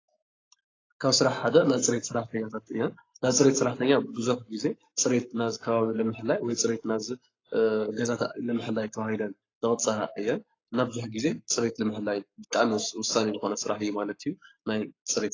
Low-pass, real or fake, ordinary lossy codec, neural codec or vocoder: 7.2 kHz; fake; AAC, 32 kbps; codec, 44.1 kHz, 7.8 kbps, Pupu-Codec